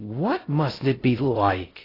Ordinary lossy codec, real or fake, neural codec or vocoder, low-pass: AAC, 24 kbps; fake; codec, 16 kHz in and 24 kHz out, 0.6 kbps, FocalCodec, streaming, 2048 codes; 5.4 kHz